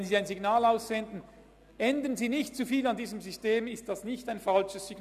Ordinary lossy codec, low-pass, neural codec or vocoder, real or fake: none; 14.4 kHz; none; real